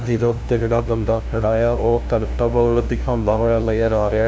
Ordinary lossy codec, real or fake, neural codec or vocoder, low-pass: none; fake; codec, 16 kHz, 0.5 kbps, FunCodec, trained on LibriTTS, 25 frames a second; none